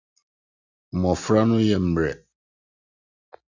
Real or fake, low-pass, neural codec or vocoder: real; 7.2 kHz; none